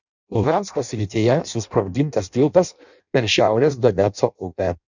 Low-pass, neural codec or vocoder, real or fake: 7.2 kHz; codec, 16 kHz in and 24 kHz out, 0.6 kbps, FireRedTTS-2 codec; fake